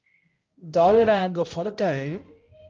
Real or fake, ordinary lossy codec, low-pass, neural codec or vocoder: fake; Opus, 32 kbps; 7.2 kHz; codec, 16 kHz, 0.5 kbps, X-Codec, HuBERT features, trained on balanced general audio